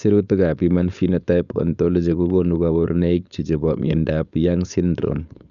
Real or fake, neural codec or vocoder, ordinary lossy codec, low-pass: fake; codec, 16 kHz, 4.8 kbps, FACodec; none; 7.2 kHz